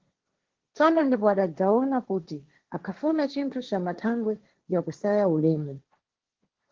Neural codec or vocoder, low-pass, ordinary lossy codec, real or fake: codec, 16 kHz, 1.1 kbps, Voila-Tokenizer; 7.2 kHz; Opus, 16 kbps; fake